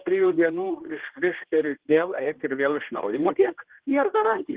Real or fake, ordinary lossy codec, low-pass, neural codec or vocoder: fake; Opus, 16 kbps; 3.6 kHz; codec, 16 kHz, 1 kbps, X-Codec, HuBERT features, trained on general audio